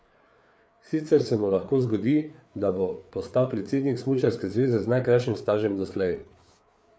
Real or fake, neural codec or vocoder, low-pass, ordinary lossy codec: fake; codec, 16 kHz, 4 kbps, FreqCodec, larger model; none; none